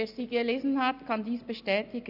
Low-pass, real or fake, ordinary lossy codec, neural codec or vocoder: 5.4 kHz; real; none; none